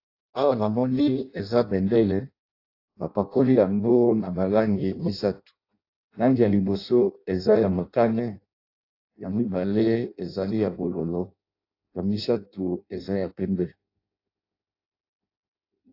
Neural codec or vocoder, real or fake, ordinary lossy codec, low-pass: codec, 16 kHz in and 24 kHz out, 0.6 kbps, FireRedTTS-2 codec; fake; AAC, 32 kbps; 5.4 kHz